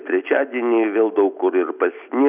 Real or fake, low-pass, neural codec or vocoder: real; 3.6 kHz; none